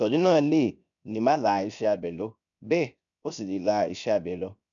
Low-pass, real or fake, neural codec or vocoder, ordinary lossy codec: 7.2 kHz; fake; codec, 16 kHz, 0.7 kbps, FocalCodec; none